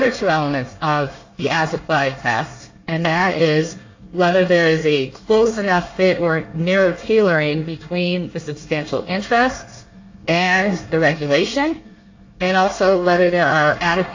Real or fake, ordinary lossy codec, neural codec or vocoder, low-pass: fake; AAC, 48 kbps; codec, 24 kHz, 1 kbps, SNAC; 7.2 kHz